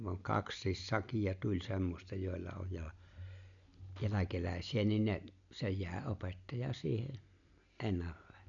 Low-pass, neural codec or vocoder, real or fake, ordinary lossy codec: 7.2 kHz; none; real; none